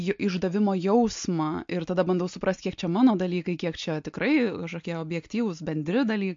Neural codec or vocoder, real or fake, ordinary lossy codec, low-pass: none; real; MP3, 48 kbps; 7.2 kHz